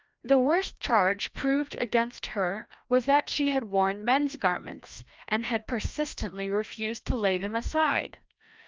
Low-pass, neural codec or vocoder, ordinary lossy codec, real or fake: 7.2 kHz; codec, 16 kHz, 1 kbps, FreqCodec, larger model; Opus, 24 kbps; fake